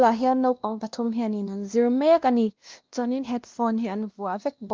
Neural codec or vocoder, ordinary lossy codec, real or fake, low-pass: codec, 16 kHz, 1 kbps, X-Codec, WavLM features, trained on Multilingual LibriSpeech; Opus, 32 kbps; fake; 7.2 kHz